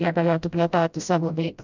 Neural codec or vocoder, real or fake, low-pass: codec, 16 kHz, 0.5 kbps, FreqCodec, smaller model; fake; 7.2 kHz